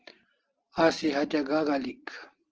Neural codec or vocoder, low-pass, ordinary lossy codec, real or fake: none; 7.2 kHz; Opus, 16 kbps; real